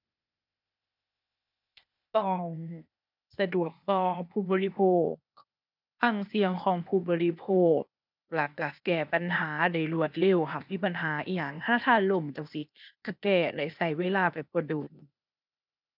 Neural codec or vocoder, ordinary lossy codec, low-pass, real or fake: codec, 16 kHz, 0.8 kbps, ZipCodec; none; 5.4 kHz; fake